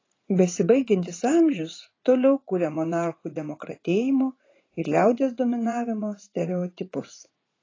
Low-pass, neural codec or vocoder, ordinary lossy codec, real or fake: 7.2 kHz; vocoder, 44.1 kHz, 80 mel bands, Vocos; AAC, 32 kbps; fake